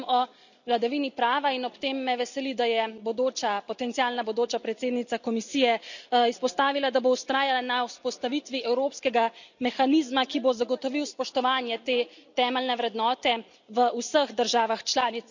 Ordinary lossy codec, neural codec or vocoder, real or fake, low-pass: none; none; real; 7.2 kHz